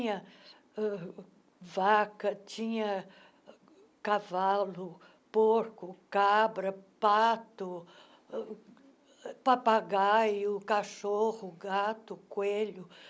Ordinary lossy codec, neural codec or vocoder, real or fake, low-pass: none; none; real; none